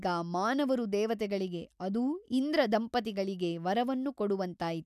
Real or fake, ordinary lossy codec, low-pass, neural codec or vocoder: real; none; 14.4 kHz; none